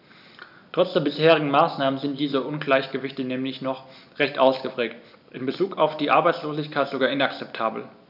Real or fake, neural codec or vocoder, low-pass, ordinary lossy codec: real; none; 5.4 kHz; none